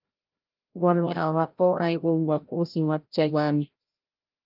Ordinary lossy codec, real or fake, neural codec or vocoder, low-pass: Opus, 32 kbps; fake; codec, 16 kHz, 0.5 kbps, FreqCodec, larger model; 5.4 kHz